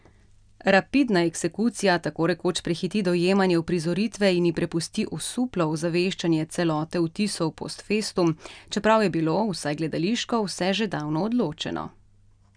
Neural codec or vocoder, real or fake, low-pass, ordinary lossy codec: none; real; 9.9 kHz; none